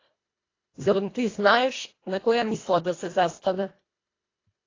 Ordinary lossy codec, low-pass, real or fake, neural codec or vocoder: AAC, 32 kbps; 7.2 kHz; fake; codec, 24 kHz, 1.5 kbps, HILCodec